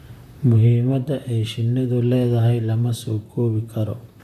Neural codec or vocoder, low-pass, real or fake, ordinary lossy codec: vocoder, 44.1 kHz, 128 mel bands, Pupu-Vocoder; 14.4 kHz; fake; none